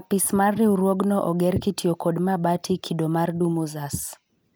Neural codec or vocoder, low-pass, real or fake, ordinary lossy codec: none; none; real; none